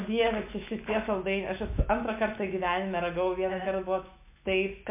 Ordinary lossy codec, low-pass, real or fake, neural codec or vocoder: MP3, 32 kbps; 3.6 kHz; fake; autoencoder, 48 kHz, 128 numbers a frame, DAC-VAE, trained on Japanese speech